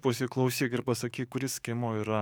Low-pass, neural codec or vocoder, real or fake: 19.8 kHz; codec, 44.1 kHz, 7.8 kbps, DAC; fake